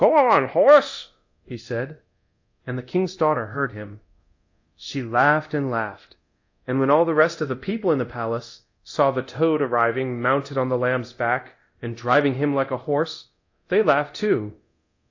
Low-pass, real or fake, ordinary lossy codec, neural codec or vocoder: 7.2 kHz; fake; AAC, 48 kbps; codec, 24 kHz, 0.9 kbps, DualCodec